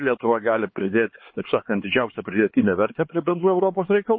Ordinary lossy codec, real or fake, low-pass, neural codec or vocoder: MP3, 24 kbps; fake; 7.2 kHz; codec, 16 kHz, 4 kbps, X-Codec, HuBERT features, trained on LibriSpeech